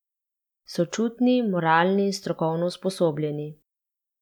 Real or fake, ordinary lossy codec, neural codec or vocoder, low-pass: real; none; none; 19.8 kHz